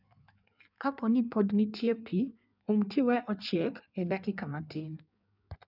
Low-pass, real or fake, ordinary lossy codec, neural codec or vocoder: 5.4 kHz; fake; none; codec, 16 kHz in and 24 kHz out, 1.1 kbps, FireRedTTS-2 codec